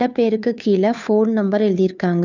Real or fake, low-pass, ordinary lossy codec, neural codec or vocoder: fake; 7.2 kHz; none; codec, 16 kHz, 2 kbps, FunCodec, trained on Chinese and English, 25 frames a second